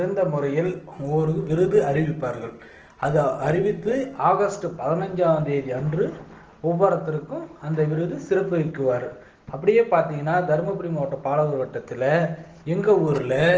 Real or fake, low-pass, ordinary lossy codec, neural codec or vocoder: real; 7.2 kHz; Opus, 16 kbps; none